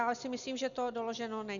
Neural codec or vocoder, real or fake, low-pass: none; real; 7.2 kHz